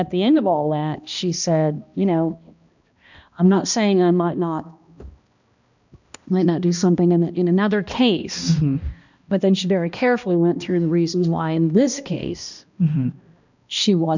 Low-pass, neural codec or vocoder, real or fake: 7.2 kHz; codec, 16 kHz, 1 kbps, X-Codec, HuBERT features, trained on balanced general audio; fake